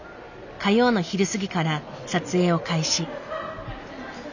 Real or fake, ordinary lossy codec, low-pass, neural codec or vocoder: real; none; 7.2 kHz; none